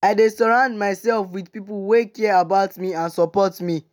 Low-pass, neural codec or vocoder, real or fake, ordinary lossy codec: 19.8 kHz; none; real; none